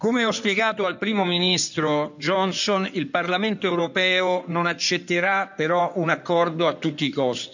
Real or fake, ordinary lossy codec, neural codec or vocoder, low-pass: fake; none; codec, 16 kHz in and 24 kHz out, 2.2 kbps, FireRedTTS-2 codec; 7.2 kHz